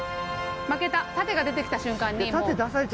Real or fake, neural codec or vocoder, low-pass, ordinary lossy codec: real; none; none; none